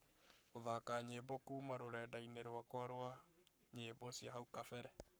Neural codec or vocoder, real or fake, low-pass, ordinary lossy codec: codec, 44.1 kHz, 7.8 kbps, Pupu-Codec; fake; none; none